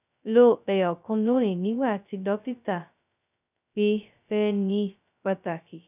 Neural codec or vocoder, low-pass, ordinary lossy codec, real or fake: codec, 16 kHz, 0.2 kbps, FocalCodec; 3.6 kHz; none; fake